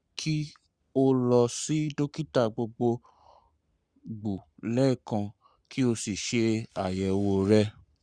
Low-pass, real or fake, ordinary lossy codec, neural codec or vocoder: 9.9 kHz; fake; Opus, 64 kbps; codec, 44.1 kHz, 7.8 kbps, Pupu-Codec